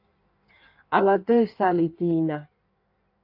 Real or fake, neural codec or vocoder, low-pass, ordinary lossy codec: fake; codec, 16 kHz in and 24 kHz out, 1.1 kbps, FireRedTTS-2 codec; 5.4 kHz; AAC, 32 kbps